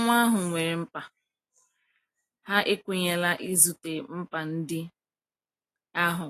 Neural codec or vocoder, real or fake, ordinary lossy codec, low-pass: none; real; AAC, 48 kbps; 14.4 kHz